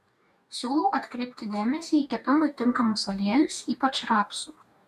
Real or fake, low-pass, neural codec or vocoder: fake; 14.4 kHz; codec, 44.1 kHz, 2.6 kbps, DAC